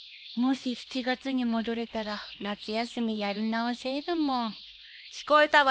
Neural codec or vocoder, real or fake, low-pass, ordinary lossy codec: codec, 16 kHz, 2 kbps, X-Codec, HuBERT features, trained on LibriSpeech; fake; none; none